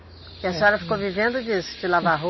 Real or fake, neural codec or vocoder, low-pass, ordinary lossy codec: real; none; 7.2 kHz; MP3, 24 kbps